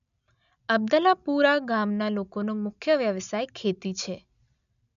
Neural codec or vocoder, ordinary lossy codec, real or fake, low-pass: none; none; real; 7.2 kHz